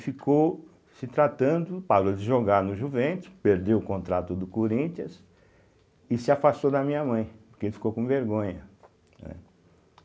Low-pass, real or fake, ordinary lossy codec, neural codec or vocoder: none; real; none; none